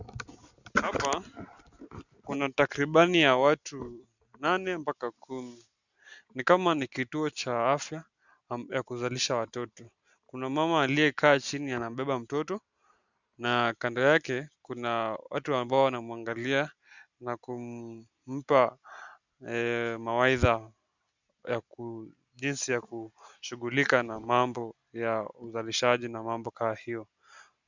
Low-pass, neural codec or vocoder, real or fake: 7.2 kHz; none; real